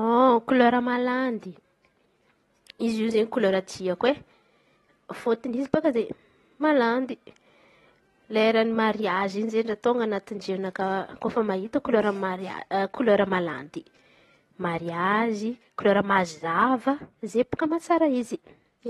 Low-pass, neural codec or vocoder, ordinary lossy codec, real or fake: 19.8 kHz; none; AAC, 32 kbps; real